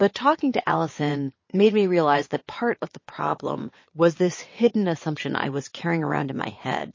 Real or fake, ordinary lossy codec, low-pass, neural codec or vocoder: fake; MP3, 32 kbps; 7.2 kHz; vocoder, 22.05 kHz, 80 mel bands, WaveNeXt